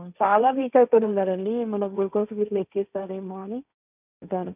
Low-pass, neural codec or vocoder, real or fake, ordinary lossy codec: 3.6 kHz; codec, 16 kHz, 1.1 kbps, Voila-Tokenizer; fake; none